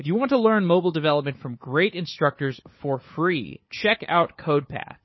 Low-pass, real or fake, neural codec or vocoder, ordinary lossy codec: 7.2 kHz; fake; codec, 16 kHz, 4 kbps, FunCodec, trained on Chinese and English, 50 frames a second; MP3, 24 kbps